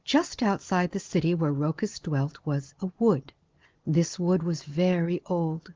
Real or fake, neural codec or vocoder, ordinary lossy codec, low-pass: real; none; Opus, 16 kbps; 7.2 kHz